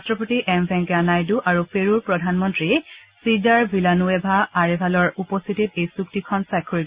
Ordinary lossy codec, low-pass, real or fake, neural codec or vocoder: Opus, 64 kbps; 3.6 kHz; real; none